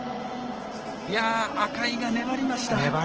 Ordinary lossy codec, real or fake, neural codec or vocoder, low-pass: Opus, 16 kbps; real; none; 7.2 kHz